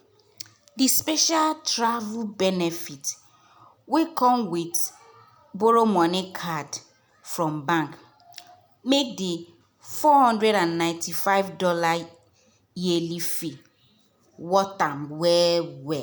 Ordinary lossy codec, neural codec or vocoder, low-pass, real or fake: none; none; none; real